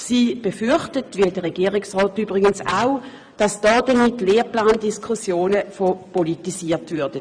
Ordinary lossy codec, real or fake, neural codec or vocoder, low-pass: none; fake; vocoder, 24 kHz, 100 mel bands, Vocos; 9.9 kHz